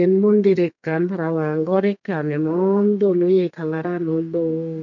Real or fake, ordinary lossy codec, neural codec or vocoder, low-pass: fake; none; codec, 32 kHz, 1.9 kbps, SNAC; 7.2 kHz